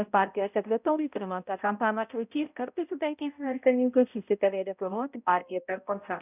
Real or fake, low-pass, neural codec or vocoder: fake; 3.6 kHz; codec, 16 kHz, 0.5 kbps, X-Codec, HuBERT features, trained on balanced general audio